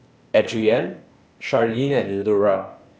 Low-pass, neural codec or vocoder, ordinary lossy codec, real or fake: none; codec, 16 kHz, 0.8 kbps, ZipCodec; none; fake